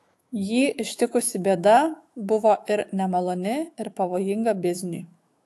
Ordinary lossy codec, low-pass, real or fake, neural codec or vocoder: AAC, 64 kbps; 14.4 kHz; fake; autoencoder, 48 kHz, 128 numbers a frame, DAC-VAE, trained on Japanese speech